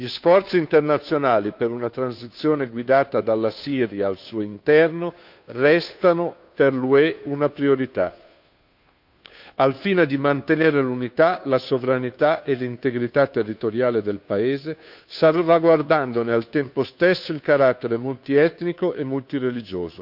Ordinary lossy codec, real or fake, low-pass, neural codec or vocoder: none; fake; 5.4 kHz; codec, 16 kHz, 2 kbps, FunCodec, trained on Chinese and English, 25 frames a second